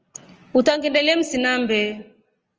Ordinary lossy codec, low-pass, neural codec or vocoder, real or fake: Opus, 24 kbps; 7.2 kHz; none; real